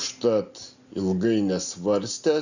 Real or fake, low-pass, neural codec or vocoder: real; 7.2 kHz; none